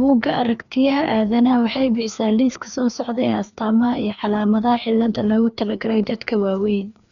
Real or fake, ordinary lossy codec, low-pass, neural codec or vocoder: fake; none; 7.2 kHz; codec, 16 kHz, 2 kbps, FreqCodec, larger model